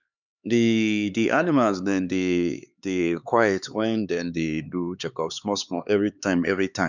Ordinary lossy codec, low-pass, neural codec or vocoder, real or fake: none; 7.2 kHz; codec, 16 kHz, 4 kbps, X-Codec, HuBERT features, trained on LibriSpeech; fake